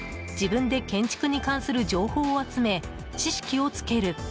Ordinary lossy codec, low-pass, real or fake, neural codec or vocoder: none; none; real; none